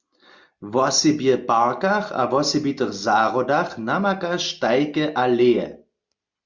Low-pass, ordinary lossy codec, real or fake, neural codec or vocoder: 7.2 kHz; Opus, 64 kbps; real; none